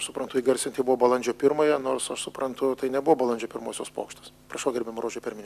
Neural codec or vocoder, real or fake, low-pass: none; real; 14.4 kHz